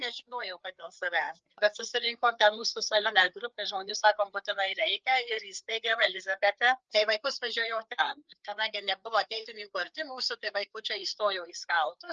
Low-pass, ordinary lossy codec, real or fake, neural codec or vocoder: 7.2 kHz; Opus, 24 kbps; fake; codec, 16 kHz, 4 kbps, FreqCodec, larger model